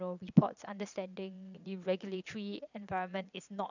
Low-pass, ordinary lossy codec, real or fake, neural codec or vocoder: 7.2 kHz; none; fake; vocoder, 22.05 kHz, 80 mel bands, Vocos